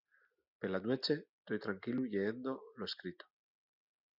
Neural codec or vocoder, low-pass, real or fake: none; 5.4 kHz; real